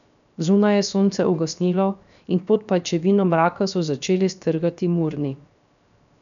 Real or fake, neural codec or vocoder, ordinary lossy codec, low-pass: fake; codec, 16 kHz, 0.7 kbps, FocalCodec; none; 7.2 kHz